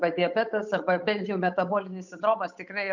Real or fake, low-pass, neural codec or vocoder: fake; 7.2 kHz; codec, 16 kHz, 8 kbps, FunCodec, trained on Chinese and English, 25 frames a second